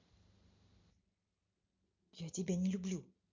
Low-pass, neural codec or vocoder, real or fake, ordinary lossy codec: 7.2 kHz; none; real; MP3, 64 kbps